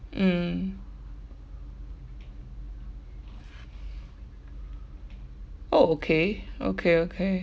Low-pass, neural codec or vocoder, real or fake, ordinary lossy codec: none; none; real; none